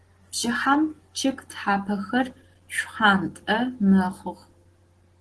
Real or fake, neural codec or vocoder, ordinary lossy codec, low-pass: real; none; Opus, 16 kbps; 10.8 kHz